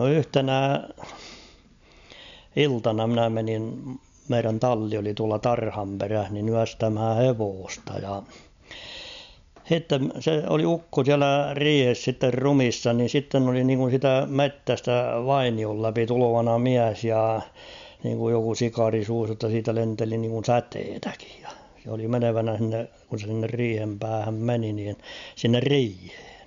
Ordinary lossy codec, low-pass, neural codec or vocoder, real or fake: MP3, 64 kbps; 7.2 kHz; none; real